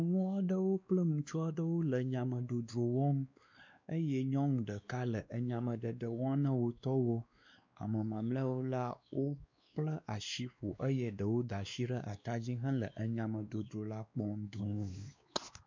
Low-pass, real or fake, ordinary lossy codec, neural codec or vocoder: 7.2 kHz; fake; MP3, 64 kbps; codec, 16 kHz, 2 kbps, X-Codec, WavLM features, trained on Multilingual LibriSpeech